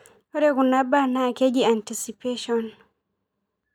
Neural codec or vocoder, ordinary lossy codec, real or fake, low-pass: none; none; real; 19.8 kHz